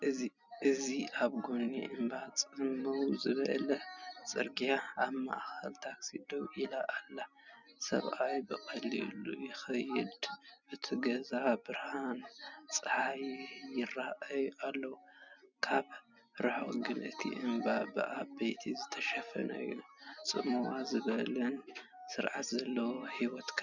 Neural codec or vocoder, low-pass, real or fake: none; 7.2 kHz; real